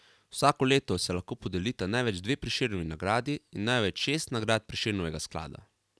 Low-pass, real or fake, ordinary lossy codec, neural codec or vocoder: none; real; none; none